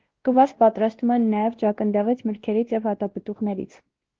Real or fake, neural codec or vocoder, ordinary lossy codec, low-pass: fake; codec, 16 kHz, 1 kbps, X-Codec, WavLM features, trained on Multilingual LibriSpeech; Opus, 16 kbps; 7.2 kHz